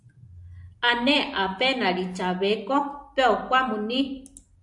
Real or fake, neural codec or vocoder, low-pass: real; none; 10.8 kHz